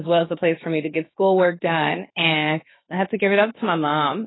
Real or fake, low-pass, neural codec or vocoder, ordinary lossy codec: fake; 7.2 kHz; codec, 16 kHz, 0.7 kbps, FocalCodec; AAC, 16 kbps